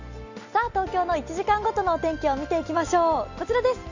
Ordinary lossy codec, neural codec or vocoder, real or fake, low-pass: Opus, 64 kbps; none; real; 7.2 kHz